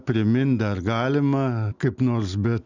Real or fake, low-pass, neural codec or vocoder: real; 7.2 kHz; none